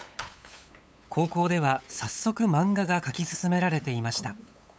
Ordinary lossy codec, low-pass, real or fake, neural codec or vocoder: none; none; fake; codec, 16 kHz, 8 kbps, FunCodec, trained on LibriTTS, 25 frames a second